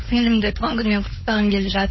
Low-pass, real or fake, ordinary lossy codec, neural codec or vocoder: 7.2 kHz; fake; MP3, 24 kbps; codec, 16 kHz, 4.8 kbps, FACodec